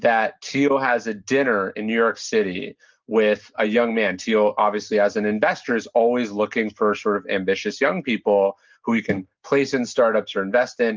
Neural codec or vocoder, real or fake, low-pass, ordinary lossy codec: none; real; 7.2 kHz; Opus, 24 kbps